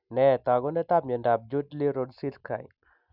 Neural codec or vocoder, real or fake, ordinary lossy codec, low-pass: none; real; none; 5.4 kHz